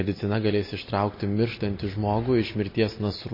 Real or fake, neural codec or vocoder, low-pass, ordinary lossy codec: real; none; 5.4 kHz; MP3, 24 kbps